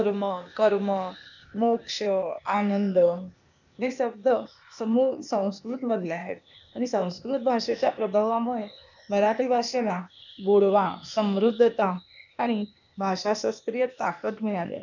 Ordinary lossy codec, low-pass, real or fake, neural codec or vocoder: none; 7.2 kHz; fake; codec, 16 kHz, 0.8 kbps, ZipCodec